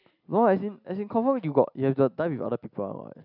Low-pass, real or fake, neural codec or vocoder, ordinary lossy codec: 5.4 kHz; real; none; none